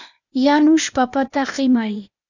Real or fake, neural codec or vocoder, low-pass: fake; codec, 16 kHz, 0.8 kbps, ZipCodec; 7.2 kHz